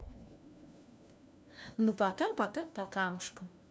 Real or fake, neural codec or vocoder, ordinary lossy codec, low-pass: fake; codec, 16 kHz, 1 kbps, FunCodec, trained on LibriTTS, 50 frames a second; none; none